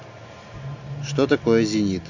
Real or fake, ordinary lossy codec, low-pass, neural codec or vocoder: real; none; 7.2 kHz; none